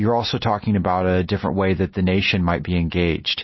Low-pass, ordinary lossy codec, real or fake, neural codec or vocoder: 7.2 kHz; MP3, 24 kbps; real; none